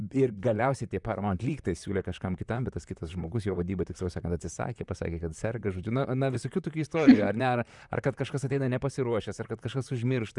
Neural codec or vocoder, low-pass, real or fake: vocoder, 44.1 kHz, 128 mel bands, Pupu-Vocoder; 10.8 kHz; fake